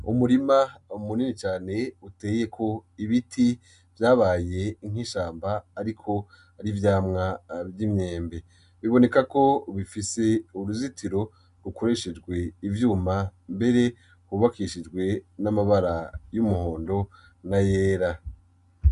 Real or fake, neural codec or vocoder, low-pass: real; none; 10.8 kHz